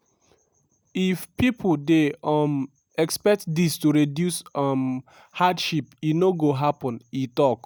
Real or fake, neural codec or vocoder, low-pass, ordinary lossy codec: real; none; none; none